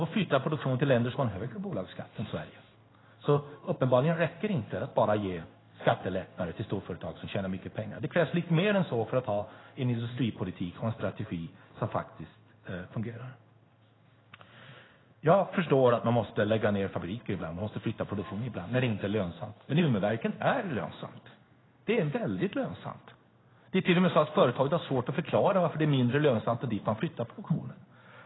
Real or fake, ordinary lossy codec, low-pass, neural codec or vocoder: fake; AAC, 16 kbps; 7.2 kHz; codec, 16 kHz in and 24 kHz out, 1 kbps, XY-Tokenizer